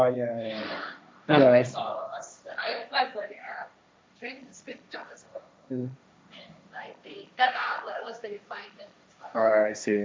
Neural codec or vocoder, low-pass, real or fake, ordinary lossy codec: codec, 16 kHz, 1.1 kbps, Voila-Tokenizer; none; fake; none